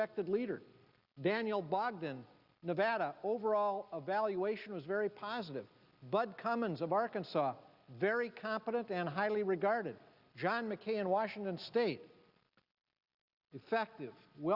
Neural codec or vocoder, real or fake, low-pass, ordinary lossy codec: none; real; 5.4 kHz; Opus, 64 kbps